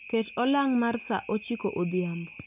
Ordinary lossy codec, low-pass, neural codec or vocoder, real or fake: none; 3.6 kHz; none; real